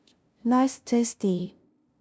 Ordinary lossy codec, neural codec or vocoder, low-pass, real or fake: none; codec, 16 kHz, 0.5 kbps, FunCodec, trained on LibriTTS, 25 frames a second; none; fake